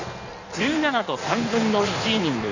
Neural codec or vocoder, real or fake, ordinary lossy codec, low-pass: codec, 16 kHz in and 24 kHz out, 1.1 kbps, FireRedTTS-2 codec; fake; none; 7.2 kHz